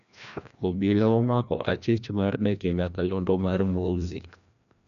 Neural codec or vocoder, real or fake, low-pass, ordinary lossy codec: codec, 16 kHz, 1 kbps, FreqCodec, larger model; fake; 7.2 kHz; none